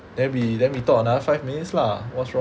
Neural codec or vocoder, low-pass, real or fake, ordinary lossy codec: none; none; real; none